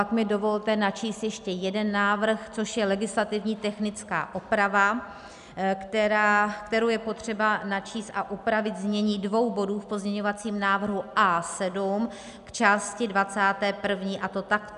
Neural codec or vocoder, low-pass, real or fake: none; 10.8 kHz; real